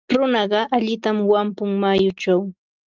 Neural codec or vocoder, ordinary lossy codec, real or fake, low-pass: none; Opus, 24 kbps; real; 7.2 kHz